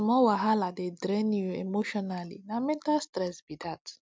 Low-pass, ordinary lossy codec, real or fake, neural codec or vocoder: none; none; real; none